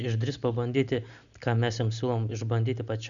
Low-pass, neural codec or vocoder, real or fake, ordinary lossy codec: 7.2 kHz; none; real; MP3, 96 kbps